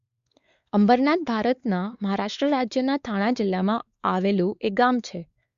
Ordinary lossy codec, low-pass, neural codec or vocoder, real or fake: Opus, 64 kbps; 7.2 kHz; codec, 16 kHz, 4 kbps, X-Codec, WavLM features, trained on Multilingual LibriSpeech; fake